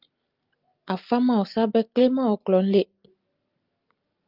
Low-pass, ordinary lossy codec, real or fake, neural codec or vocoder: 5.4 kHz; Opus, 24 kbps; real; none